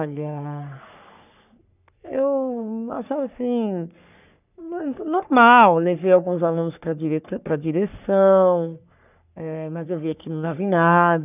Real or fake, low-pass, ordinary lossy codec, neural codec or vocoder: fake; 3.6 kHz; none; codec, 44.1 kHz, 3.4 kbps, Pupu-Codec